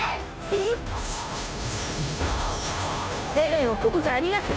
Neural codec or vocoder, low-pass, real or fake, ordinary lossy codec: codec, 16 kHz, 0.5 kbps, FunCodec, trained on Chinese and English, 25 frames a second; none; fake; none